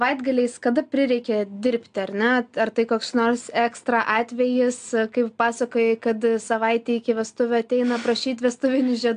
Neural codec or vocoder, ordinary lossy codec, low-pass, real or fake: none; AAC, 64 kbps; 9.9 kHz; real